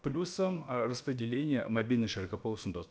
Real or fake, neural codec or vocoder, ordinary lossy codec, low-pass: fake; codec, 16 kHz, about 1 kbps, DyCAST, with the encoder's durations; none; none